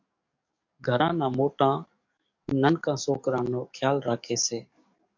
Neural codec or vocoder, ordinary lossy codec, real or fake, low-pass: codec, 44.1 kHz, 7.8 kbps, DAC; MP3, 48 kbps; fake; 7.2 kHz